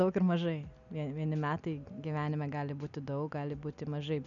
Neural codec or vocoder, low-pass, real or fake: none; 7.2 kHz; real